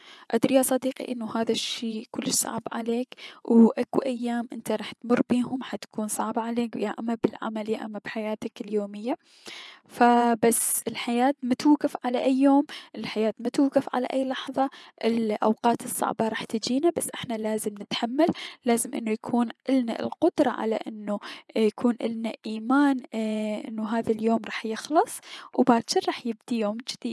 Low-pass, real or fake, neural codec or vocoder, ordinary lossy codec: none; fake; vocoder, 24 kHz, 100 mel bands, Vocos; none